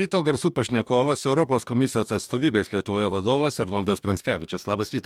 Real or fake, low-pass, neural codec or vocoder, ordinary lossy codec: fake; 19.8 kHz; codec, 44.1 kHz, 2.6 kbps, DAC; MP3, 96 kbps